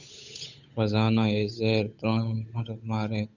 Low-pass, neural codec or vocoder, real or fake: 7.2 kHz; codec, 16 kHz, 8 kbps, FunCodec, trained on Chinese and English, 25 frames a second; fake